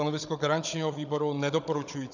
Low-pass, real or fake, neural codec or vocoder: 7.2 kHz; fake; codec, 16 kHz, 16 kbps, FunCodec, trained on Chinese and English, 50 frames a second